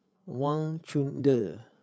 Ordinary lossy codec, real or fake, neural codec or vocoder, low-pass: none; fake; codec, 16 kHz, 8 kbps, FreqCodec, larger model; none